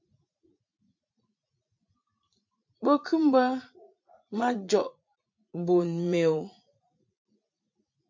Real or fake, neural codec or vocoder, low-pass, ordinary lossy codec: fake; vocoder, 44.1 kHz, 80 mel bands, Vocos; 7.2 kHz; MP3, 64 kbps